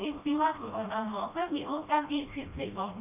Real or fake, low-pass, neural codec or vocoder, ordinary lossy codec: fake; 3.6 kHz; codec, 16 kHz, 1 kbps, FreqCodec, smaller model; none